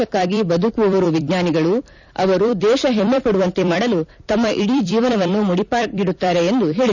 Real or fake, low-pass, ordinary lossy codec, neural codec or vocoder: fake; 7.2 kHz; none; vocoder, 44.1 kHz, 128 mel bands every 256 samples, BigVGAN v2